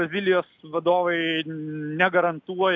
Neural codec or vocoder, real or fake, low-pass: none; real; 7.2 kHz